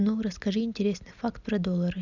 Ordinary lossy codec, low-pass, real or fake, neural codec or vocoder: none; 7.2 kHz; real; none